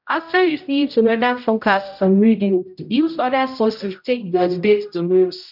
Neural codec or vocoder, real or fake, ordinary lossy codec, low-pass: codec, 16 kHz, 0.5 kbps, X-Codec, HuBERT features, trained on general audio; fake; none; 5.4 kHz